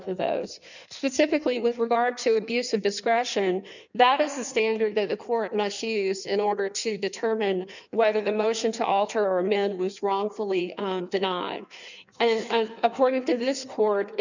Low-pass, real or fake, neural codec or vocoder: 7.2 kHz; fake; codec, 16 kHz in and 24 kHz out, 1.1 kbps, FireRedTTS-2 codec